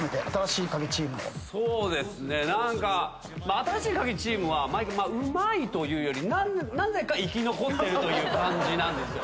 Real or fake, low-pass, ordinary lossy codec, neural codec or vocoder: real; none; none; none